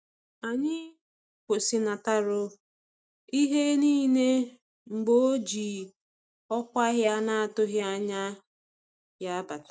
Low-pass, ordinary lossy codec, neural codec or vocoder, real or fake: none; none; none; real